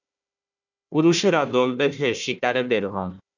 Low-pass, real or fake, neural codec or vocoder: 7.2 kHz; fake; codec, 16 kHz, 1 kbps, FunCodec, trained on Chinese and English, 50 frames a second